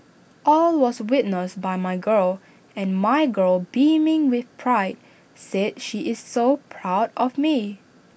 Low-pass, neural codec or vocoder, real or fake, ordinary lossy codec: none; none; real; none